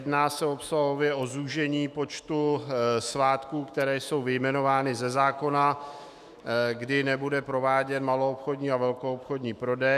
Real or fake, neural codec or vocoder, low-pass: fake; autoencoder, 48 kHz, 128 numbers a frame, DAC-VAE, trained on Japanese speech; 14.4 kHz